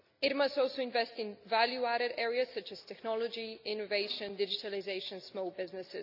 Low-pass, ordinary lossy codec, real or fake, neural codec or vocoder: 5.4 kHz; none; real; none